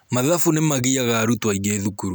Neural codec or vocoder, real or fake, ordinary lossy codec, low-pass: none; real; none; none